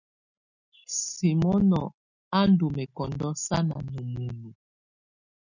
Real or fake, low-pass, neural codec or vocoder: real; 7.2 kHz; none